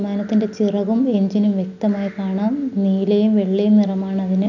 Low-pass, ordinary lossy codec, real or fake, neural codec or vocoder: 7.2 kHz; none; real; none